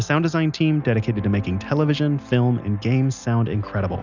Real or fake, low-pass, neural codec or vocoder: real; 7.2 kHz; none